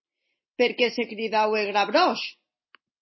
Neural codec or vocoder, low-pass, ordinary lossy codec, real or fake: none; 7.2 kHz; MP3, 24 kbps; real